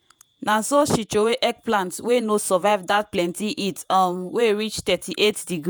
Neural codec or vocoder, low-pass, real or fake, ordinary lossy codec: vocoder, 48 kHz, 128 mel bands, Vocos; none; fake; none